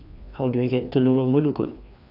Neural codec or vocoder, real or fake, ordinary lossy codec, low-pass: codec, 16 kHz, 2 kbps, FreqCodec, larger model; fake; none; 5.4 kHz